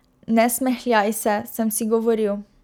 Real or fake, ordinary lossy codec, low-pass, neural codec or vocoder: real; none; none; none